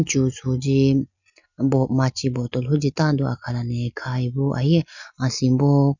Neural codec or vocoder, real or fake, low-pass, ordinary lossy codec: none; real; 7.2 kHz; none